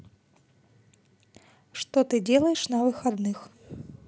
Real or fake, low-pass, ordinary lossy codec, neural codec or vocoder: real; none; none; none